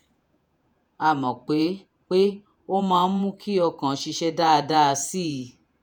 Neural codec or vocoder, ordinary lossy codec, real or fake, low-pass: vocoder, 48 kHz, 128 mel bands, Vocos; none; fake; none